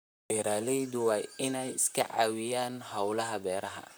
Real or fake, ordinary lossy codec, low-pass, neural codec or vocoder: fake; none; none; vocoder, 44.1 kHz, 128 mel bands, Pupu-Vocoder